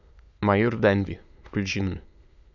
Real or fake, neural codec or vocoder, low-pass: fake; autoencoder, 22.05 kHz, a latent of 192 numbers a frame, VITS, trained on many speakers; 7.2 kHz